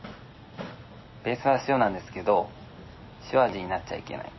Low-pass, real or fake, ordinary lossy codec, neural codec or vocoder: 7.2 kHz; real; MP3, 24 kbps; none